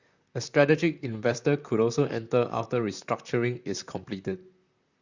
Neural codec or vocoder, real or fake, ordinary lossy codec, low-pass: vocoder, 44.1 kHz, 128 mel bands, Pupu-Vocoder; fake; Opus, 64 kbps; 7.2 kHz